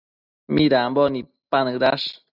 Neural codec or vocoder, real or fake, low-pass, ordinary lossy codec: none; real; 5.4 kHz; Opus, 64 kbps